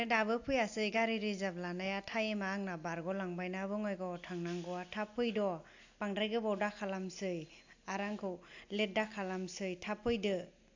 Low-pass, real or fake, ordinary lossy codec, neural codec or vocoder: 7.2 kHz; real; AAC, 48 kbps; none